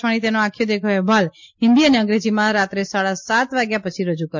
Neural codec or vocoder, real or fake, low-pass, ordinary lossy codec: none; real; 7.2 kHz; MP3, 48 kbps